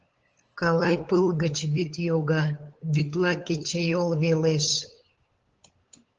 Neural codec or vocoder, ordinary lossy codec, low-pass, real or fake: codec, 16 kHz, 8 kbps, FunCodec, trained on LibriTTS, 25 frames a second; Opus, 16 kbps; 7.2 kHz; fake